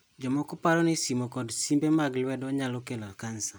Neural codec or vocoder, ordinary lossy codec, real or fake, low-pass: none; none; real; none